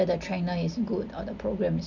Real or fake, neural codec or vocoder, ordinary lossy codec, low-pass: real; none; none; 7.2 kHz